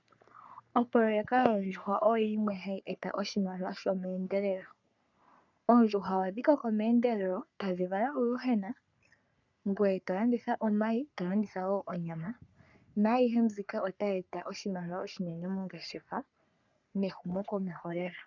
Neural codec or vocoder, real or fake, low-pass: codec, 44.1 kHz, 3.4 kbps, Pupu-Codec; fake; 7.2 kHz